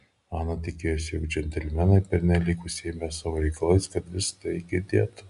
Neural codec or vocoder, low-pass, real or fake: none; 10.8 kHz; real